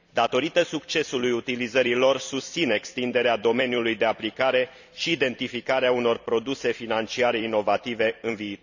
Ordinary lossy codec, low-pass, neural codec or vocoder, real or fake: none; 7.2 kHz; vocoder, 44.1 kHz, 128 mel bands every 256 samples, BigVGAN v2; fake